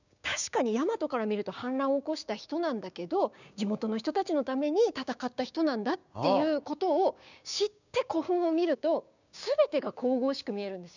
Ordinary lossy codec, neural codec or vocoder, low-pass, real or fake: none; codec, 16 kHz, 6 kbps, DAC; 7.2 kHz; fake